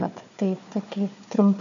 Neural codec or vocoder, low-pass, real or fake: codec, 16 kHz, 4 kbps, FunCodec, trained on Chinese and English, 50 frames a second; 7.2 kHz; fake